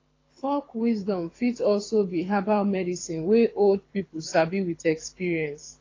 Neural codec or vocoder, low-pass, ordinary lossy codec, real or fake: codec, 24 kHz, 6 kbps, HILCodec; 7.2 kHz; AAC, 32 kbps; fake